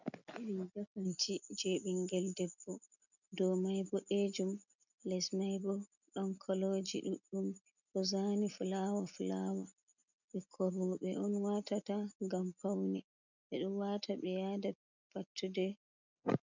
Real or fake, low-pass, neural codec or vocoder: real; 7.2 kHz; none